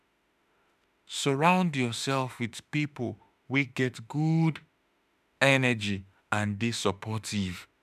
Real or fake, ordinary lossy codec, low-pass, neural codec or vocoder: fake; none; 14.4 kHz; autoencoder, 48 kHz, 32 numbers a frame, DAC-VAE, trained on Japanese speech